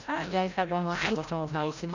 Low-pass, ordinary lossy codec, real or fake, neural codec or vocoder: 7.2 kHz; none; fake; codec, 16 kHz, 0.5 kbps, FreqCodec, larger model